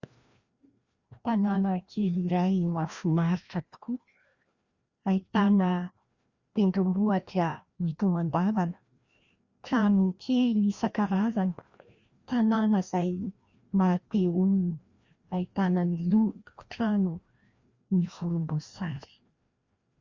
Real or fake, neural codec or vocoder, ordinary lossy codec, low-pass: fake; codec, 16 kHz, 1 kbps, FreqCodec, larger model; Opus, 64 kbps; 7.2 kHz